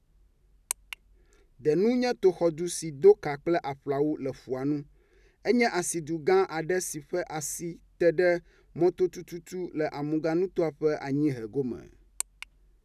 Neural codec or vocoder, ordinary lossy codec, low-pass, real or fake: none; none; 14.4 kHz; real